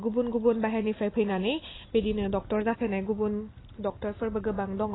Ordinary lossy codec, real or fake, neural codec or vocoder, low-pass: AAC, 16 kbps; real; none; 7.2 kHz